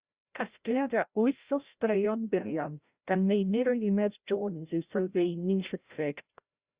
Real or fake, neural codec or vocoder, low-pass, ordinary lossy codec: fake; codec, 16 kHz, 0.5 kbps, FreqCodec, larger model; 3.6 kHz; Opus, 64 kbps